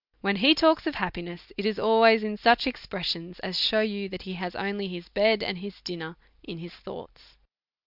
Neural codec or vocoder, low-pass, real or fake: none; 5.4 kHz; real